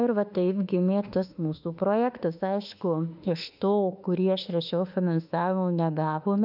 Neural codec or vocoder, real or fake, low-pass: autoencoder, 48 kHz, 32 numbers a frame, DAC-VAE, trained on Japanese speech; fake; 5.4 kHz